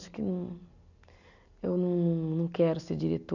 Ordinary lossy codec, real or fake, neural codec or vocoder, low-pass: none; real; none; 7.2 kHz